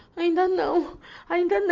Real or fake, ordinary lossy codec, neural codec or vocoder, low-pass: real; Opus, 32 kbps; none; 7.2 kHz